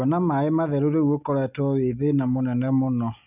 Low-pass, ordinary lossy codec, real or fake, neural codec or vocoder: 3.6 kHz; none; real; none